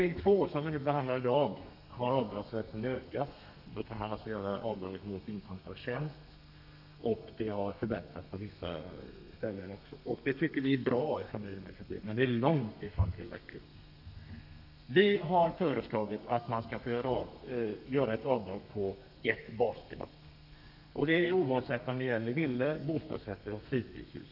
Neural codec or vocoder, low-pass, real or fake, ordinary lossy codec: codec, 32 kHz, 1.9 kbps, SNAC; 5.4 kHz; fake; none